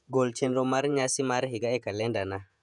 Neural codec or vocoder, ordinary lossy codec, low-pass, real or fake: vocoder, 48 kHz, 128 mel bands, Vocos; none; 10.8 kHz; fake